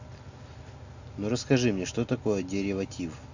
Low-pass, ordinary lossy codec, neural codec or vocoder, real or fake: 7.2 kHz; none; none; real